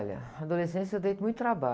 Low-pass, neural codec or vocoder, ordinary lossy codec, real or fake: none; none; none; real